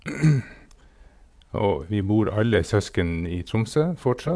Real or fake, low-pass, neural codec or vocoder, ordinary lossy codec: fake; none; vocoder, 22.05 kHz, 80 mel bands, Vocos; none